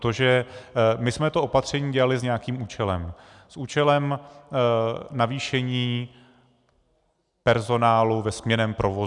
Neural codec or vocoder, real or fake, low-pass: none; real; 10.8 kHz